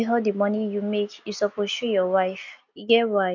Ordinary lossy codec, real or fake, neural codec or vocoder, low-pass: none; real; none; 7.2 kHz